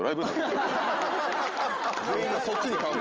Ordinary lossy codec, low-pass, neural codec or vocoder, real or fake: Opus, 24 kbps; 7.2 kHz; none; real